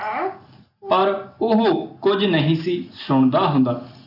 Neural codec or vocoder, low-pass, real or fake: none; 5.4 kHz; real